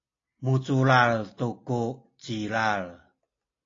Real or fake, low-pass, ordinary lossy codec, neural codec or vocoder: real; 7.2 kHz; AAC, 32 kbps; none